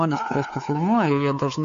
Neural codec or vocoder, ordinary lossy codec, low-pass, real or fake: codec, 16 kHz, 4 kbps, X-Codec, WavLM features, trained on Multilingual LibriSpeech; AAC, 64 kbps; 7.2 kHz; fake